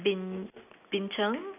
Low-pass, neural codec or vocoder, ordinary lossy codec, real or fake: 3.6 kHz; none; none; real